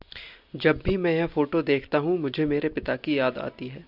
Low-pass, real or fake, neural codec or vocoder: 5.4 kHz; real; none